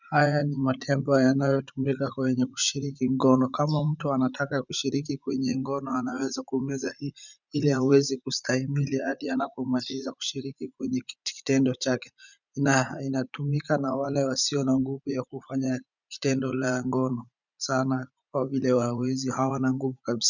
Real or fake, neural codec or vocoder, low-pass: fake; vocoder, 44.1 kHz, 80 mel bands, Vocos; 7.2 kHz